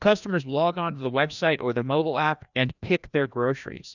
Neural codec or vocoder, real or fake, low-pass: codec, 16 kHz, 1 kbps, FreqCodec, larger model; fake; 7.2 kHz